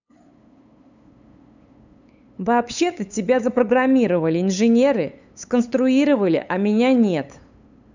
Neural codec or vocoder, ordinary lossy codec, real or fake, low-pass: codec, 16 kHz, 8 kbps, FunCodec, trained on LibriTTS, 25 frames a second; none; fake; 7.2 kHz